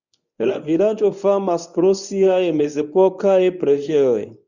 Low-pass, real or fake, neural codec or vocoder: 7.2 kHz; fake; codec, 24 kHz, 0.9 kbps, WavTokenizer, medium speech release version 1